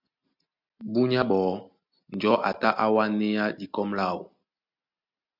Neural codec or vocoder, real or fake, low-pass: none; real; 5.4 kHz